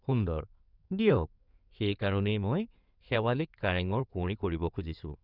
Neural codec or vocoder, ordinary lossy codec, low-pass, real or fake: codec, 16 kHz in and 24 kHz out, 2.2 kbps, FireRedTTS-2 codec; none; 5.4 kHz; fake